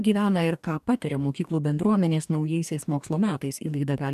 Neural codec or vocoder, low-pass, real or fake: codec, 44.1 kHz, 2.6 kbps, DAC; 14.4 kHz; fake